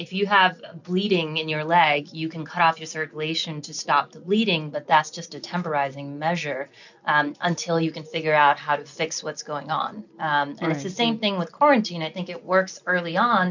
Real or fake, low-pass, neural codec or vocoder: real; 7.2 kHz; none